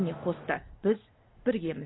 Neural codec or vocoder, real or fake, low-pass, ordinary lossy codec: none; real; 7.2 kHz; AAC, 16 kbps